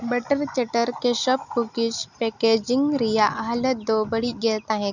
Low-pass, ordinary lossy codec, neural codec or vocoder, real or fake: 7.2 kHz; none; none; real